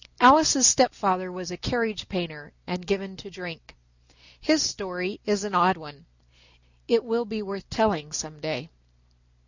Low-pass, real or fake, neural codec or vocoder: 7.2 kHz; real; none